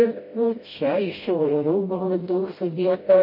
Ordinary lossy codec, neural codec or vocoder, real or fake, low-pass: MP3, 24 kbps; codec, 16 kHz, 0.5 kbps, FreqCodec, smaller model; fake; 5.4 kHz